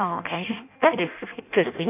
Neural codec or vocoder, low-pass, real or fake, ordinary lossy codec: codec, 16 kHz in and 24 kHz out, 0.6 kbps, FireRedTTS-2 codec; 3.6 kHz; fake; none